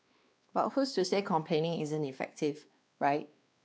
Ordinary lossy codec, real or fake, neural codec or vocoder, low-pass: none; fake; codec, 16 kHz, 2 kbps, X-Codec, WavLM features, trained on Multilingual LibriSpeech; none